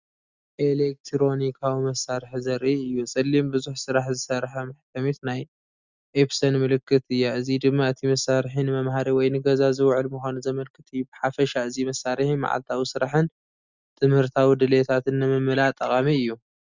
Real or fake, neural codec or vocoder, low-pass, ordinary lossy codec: real; none; 7.2 kHz; Opus, 64 kbps